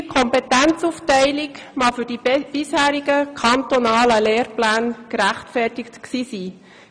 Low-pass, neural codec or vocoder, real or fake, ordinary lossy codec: 9.9 kHz; none; real; none